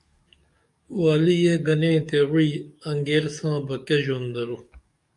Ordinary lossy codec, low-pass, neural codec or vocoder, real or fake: MP3, 96 kbps; 10.8 kHz; codec, 44.1 kHz, 7.8 kbps, DAC; fake